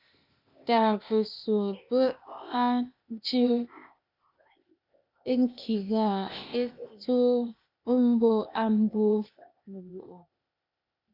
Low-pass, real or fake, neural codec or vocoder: 5.4 kHz; fake; codec, 16 kHz, 0.8 kbps, ZipCodec